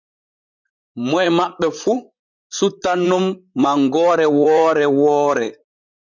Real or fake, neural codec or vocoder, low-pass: fake; vocoder, 44.1 kHz, 128 mel bands, Pupu-Vocoder; 7.2 kHz